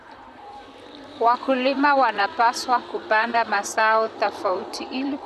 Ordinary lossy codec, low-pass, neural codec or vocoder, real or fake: none; 14.4 kHz; vocoder, 44.1 kHz, 128 mel bands, Pupu-Vocoder; fake